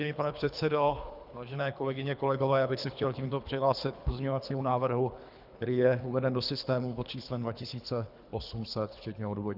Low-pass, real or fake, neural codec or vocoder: 5.4 kHz; fake; codec, 24 kHz, 3 kbps, HILCodec